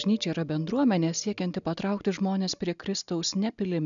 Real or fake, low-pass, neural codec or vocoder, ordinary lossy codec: real; 7.2 kHz; none; MP3, 96 kbps